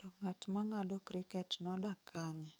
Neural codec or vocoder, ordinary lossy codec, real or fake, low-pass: codec, 44.1 kHz, 7.8 kbps, DAC; none; fake; none